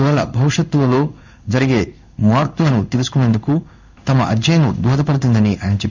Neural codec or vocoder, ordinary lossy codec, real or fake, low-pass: codec, 16 kHz in and 24 kHz out, 1 kbps, XY-Tokenizer; none; fake; 7.2 kHz